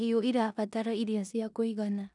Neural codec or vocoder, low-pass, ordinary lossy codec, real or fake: codec, 16 kHz in and 24 kHz out, 0.9 kbps, LongCat-Audio-Codec, four codebook decoder; 10.8 kHz; none; fake